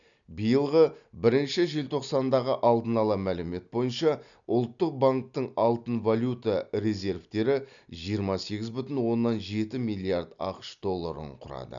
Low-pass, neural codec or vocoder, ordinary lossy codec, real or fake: 7.2 kHz; none; none; real